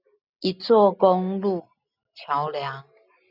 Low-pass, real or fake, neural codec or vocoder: 5.4 kHz; real; none